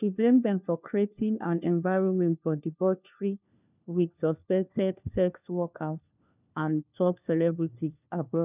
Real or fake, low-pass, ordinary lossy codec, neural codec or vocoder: fake; 3.6 kHz; none; codec, 16 kHz, 1 kbps, FunCodec, trained on LibriTTS, 50 frames a second